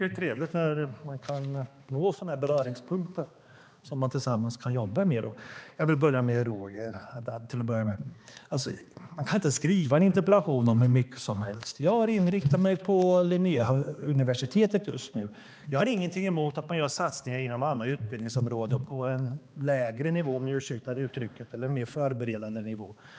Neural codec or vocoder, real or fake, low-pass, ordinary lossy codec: codec, 16 kHz, 2 kbps, X-Codec, HuBERT features, trained on balanced general audio; fake; none; none